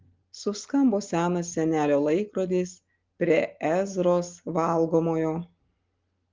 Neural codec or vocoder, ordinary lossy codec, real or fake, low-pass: none; Opus, 32 kbps; real; 7.2 kHz